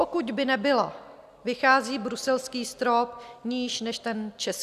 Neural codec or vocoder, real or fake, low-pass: none; real; 14.4 kHz